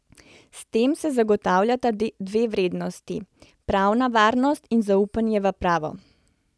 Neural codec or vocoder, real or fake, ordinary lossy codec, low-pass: none; real; none; none